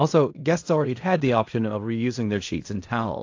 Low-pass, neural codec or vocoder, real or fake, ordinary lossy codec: 7.2 kHz; codec, 16 kHz in and 24 kHz out, 0.4 kbps, LongCat-Audio-Codec, fine tuned four codebook decoder; fake; AAC, 48 kbps